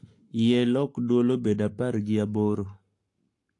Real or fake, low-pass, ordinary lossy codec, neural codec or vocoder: fake; 10.8 kHz; AAC, 48 kbps; codec, 44.1 kHz, 7.8 kbps, Pupu-Codec